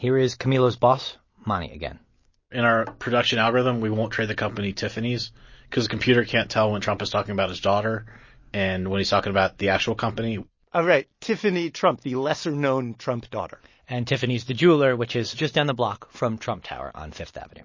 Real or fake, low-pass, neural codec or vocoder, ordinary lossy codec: real; 7.2 kHz; none; MP3, 32 kbps